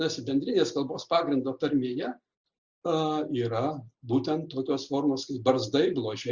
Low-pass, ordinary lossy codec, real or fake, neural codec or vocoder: 7.2 kHz; Opus, 64 kbps; real; none